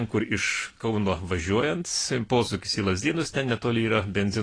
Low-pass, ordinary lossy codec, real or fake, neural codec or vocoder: 9.9 kHz; AAC, 32 kbps; real; none